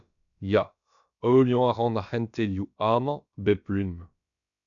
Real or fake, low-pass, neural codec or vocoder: fake; 7.2 kHz; codec, 16 kHz, about 1 kbps, DyCAST, with the encoder's durations